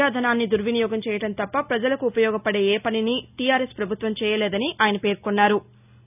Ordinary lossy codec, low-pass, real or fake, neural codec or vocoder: none; 3.6 kHz; real; none